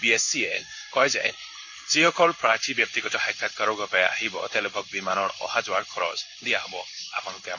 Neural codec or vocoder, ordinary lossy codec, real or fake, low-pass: codec, 16 kHz in and 24 kHz out, 1 kbps, XY-Tokenizer; none; fake; 7.2 kHz